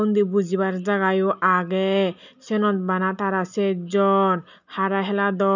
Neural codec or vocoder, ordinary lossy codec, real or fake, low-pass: none; none; real; 7.2 kHz